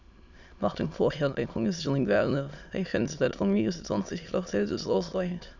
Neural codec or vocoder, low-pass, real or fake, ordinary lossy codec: autoencoder, 22.05 kHz, a latent of 192 numbers a frame, VITS, trained on many speakers; 7.2 kHz; fake; none